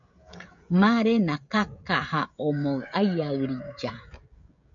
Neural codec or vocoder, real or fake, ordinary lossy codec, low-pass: codec, 16 kHz, 16 kbps, FreqCodec, smaller model; fake; AAC, 64 kbps; 7.2 kHz